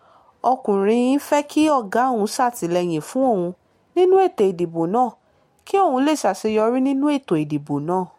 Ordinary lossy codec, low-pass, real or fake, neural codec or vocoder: MP3, 64 kbps; 19.8 kHz; real; none